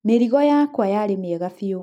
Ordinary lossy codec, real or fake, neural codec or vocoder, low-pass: none; real; none; 19.8 kHz